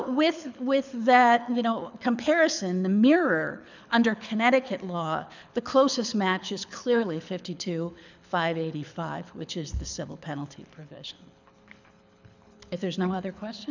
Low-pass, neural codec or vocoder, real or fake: 7.2 kHz; codec, 24 kHz, 6 kbps, HILCodec; fake